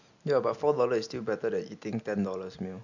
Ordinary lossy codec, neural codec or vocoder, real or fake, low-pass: none; none; real; 7.2 kHz